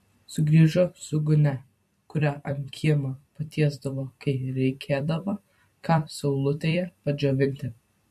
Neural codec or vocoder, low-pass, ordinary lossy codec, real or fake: vocoder, 44.1 kHz, 128 mel bands every 256 samples, BigVGAN v2; 14.4 kHz; MP3, 64 kbps; fake